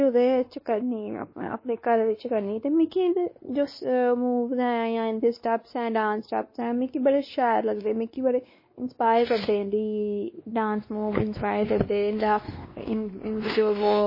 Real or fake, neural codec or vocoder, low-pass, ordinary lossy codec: fake; codec, 16 kHz, 2 kbps, X-Codec, WavLM features, trained on Multilingual LibriSpeech; 5.4 kHz; MP3, 24 kbps